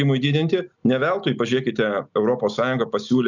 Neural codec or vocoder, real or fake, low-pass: none; real; 7.2 kHz